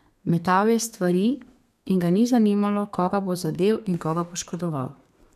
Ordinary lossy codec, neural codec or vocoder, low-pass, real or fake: none; codec, 32 kHz, 1.9 kbps, SNAC; 14.4 kHz; fake